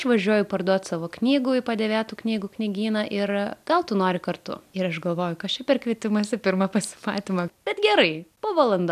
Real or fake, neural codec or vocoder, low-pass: real; none; 14.4 kHz